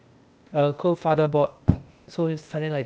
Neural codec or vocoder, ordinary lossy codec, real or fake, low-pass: codec, 16 kHz, 0.8 kbps, ZipCodec; none; fake; none